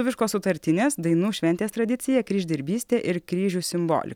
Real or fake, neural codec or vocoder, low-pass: real; none; 19.8 kHz